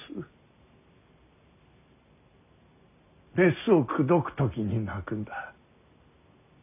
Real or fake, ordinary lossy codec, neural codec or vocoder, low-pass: real; none; none; 3.6 kHz